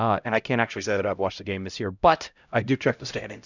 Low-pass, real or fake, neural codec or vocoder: 7.2 kHz; fake; codec, 16 kHz, 0.5 kbps, X-Codec, HuBERT features, trained on LibriSpeech